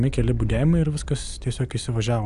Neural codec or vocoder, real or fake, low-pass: none; real; 10.8 kHz